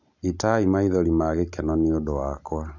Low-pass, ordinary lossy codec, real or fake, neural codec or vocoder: 7.2 kHz; none; real; none